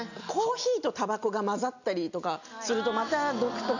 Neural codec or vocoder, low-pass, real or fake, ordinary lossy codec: none; 7.2 kHz; real; none